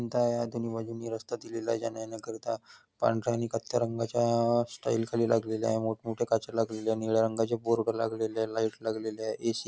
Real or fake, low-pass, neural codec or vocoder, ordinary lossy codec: real; none; none; none